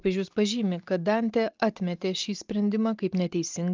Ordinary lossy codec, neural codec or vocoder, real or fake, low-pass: Opus, 24 kbps; none; real; 7.2 kHz